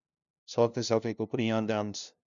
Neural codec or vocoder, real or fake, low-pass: codec, 16 kHz, 0.5 kbps, FunCodec, trained on LibriTTS, 25 frames a second; fake; 7.2 kHz